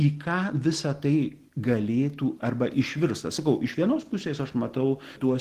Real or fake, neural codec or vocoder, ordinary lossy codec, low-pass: real; none; Opus, 16 kbps; 14.4 kHz